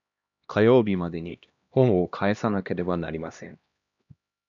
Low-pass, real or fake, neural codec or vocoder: 7.2 kHz; fake; codec, 16 kHz, 1 kbps, X-Codec, HuBERT features, trained on LibriSpeech